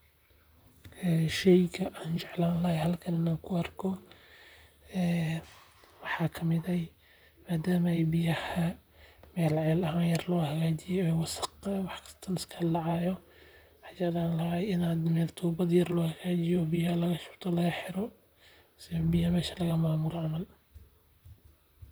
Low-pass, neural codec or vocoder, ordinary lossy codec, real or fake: none; vocoder, 44.1 kHz, 128 mel bands, Pupu-Vocoder; none; fake